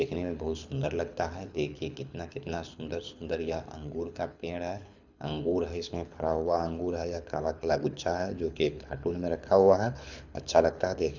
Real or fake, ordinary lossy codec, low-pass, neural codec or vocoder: fake; none; 7.2 kHz; codec, 24 kHz, 6 kbps, HILCodec